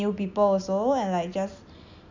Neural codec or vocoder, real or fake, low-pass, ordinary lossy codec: none; real; 7.2 kHz; none